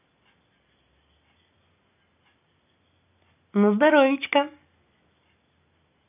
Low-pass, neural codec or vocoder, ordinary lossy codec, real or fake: 3.6 kHz; none; none; real